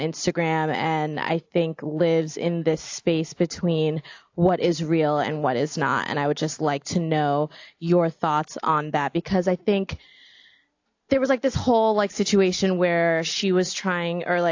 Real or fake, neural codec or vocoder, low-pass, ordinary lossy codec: real; none; 7.2 kHz; AAC, 48 kbps